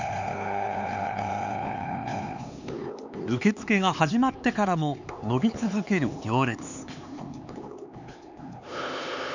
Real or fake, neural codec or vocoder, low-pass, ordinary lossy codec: fake; codec, 16 kHz, 4 kbps, X-Codec, HuBERT features, trained on LibriSpeech; 7.2 kHz; none